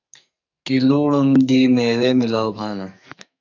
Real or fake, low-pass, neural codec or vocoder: fake; 7.2 kHz; codec, 44.1 kHz, 2.6 kbps, SNAC